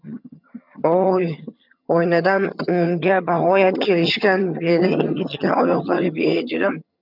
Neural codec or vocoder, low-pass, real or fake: vocoder, 22.05 kHz, 80 mel bands, HiFi-GAN; 5.4 kHz; fake